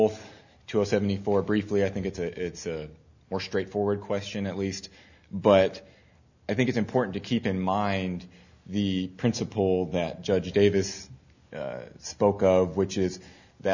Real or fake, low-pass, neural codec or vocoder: real; 7.2 kHz; none